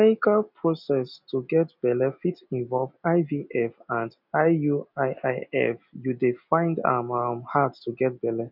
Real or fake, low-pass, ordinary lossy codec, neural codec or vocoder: real; 5.4 kHz; none; none